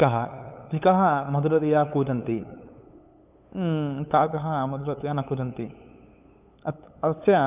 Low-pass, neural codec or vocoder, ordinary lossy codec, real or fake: 3.6 kHz; codec, 16 kHz, 8 kbps, FunCodec, trained on LibriTTS, 25 frames a second; none; fake